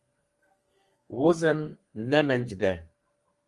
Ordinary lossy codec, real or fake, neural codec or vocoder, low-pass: Opus, 24 kbps; fake; codec, 32 kHz, 1.9 kbps, SNAC; 10.8 kHz